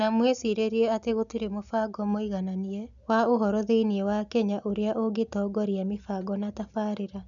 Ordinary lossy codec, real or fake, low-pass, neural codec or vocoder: none; real; 7.2 kHz; none